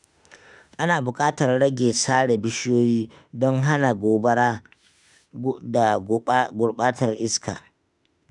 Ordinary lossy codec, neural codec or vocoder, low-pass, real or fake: none; autoencoder, 48 kHz, 32 numbers a frame, DAC-VAE, trained on Japanese speech; 10.8 kHz; fake